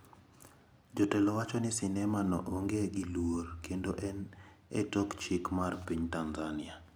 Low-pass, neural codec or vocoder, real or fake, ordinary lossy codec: none; none; real; none